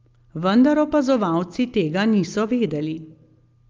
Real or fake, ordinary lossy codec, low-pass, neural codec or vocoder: real; Opus, 32 kbps; 7.2 kHz; none